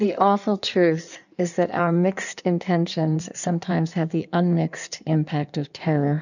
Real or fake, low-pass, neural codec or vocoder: fake; 7.2 kHz; codec, 16 kHz in and 24 kHz out, 1.1 kbps, FireRedTTS-2 codec